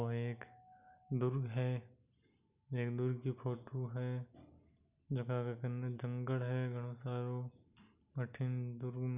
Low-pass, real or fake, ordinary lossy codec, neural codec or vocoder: 3.6 kHz; real; none; none